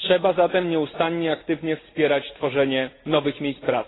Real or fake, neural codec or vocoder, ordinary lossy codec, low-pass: real; none; AAC, 16 kbps; 7.2 kHz